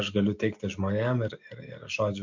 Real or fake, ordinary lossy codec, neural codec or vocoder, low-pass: real; MP3, 48 kbps; none; 7.2 kHz